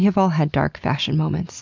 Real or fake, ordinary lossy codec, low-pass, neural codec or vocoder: real; MP3, 64 kbps; 7.2 kHz; none